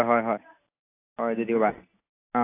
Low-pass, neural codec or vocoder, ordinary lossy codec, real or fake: 3.6 kHz; none; AAC, 24 kbps; real